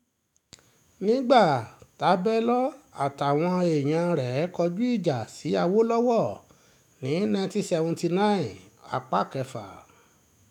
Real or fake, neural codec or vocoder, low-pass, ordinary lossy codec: fake; autoencoder, 48 kHz, 128 numbers a frame, DAC-VAE, trained on Japanese speech; 19.8 kHz; none